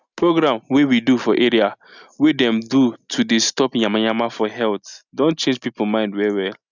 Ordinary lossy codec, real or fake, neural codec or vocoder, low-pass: none; real; none; 7.2 kHz